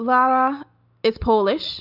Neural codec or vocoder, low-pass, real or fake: none; 5.4 kHz; real